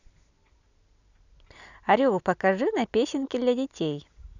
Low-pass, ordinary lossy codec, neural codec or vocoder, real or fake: 7.2 kHz; none; none; real